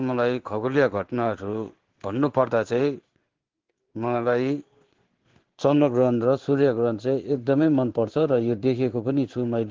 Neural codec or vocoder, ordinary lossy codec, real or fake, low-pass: codec, 16 kHz in and 24 kHz out, 1 kbps, XY-Tokenizer; Opus, 16 kbps; fake; 7.2 kHz